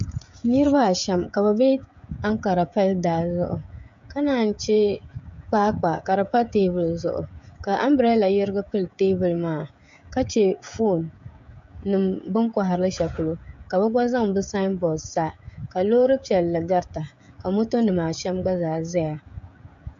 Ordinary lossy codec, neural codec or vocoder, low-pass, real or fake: MP3, 64 kbps; codec, 16 kHz, 16 kbps, FreqCodec, smaller model; 7.2 kHz; fake